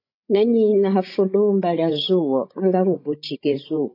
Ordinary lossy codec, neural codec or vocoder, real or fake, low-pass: AAC, 32 kbps; codec, 16 kHz, 16 kbps, FreqCodec, larger model; fake; 5.4 kHz